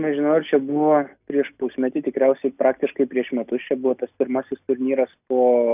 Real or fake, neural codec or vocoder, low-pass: real; none; 3.6 kHz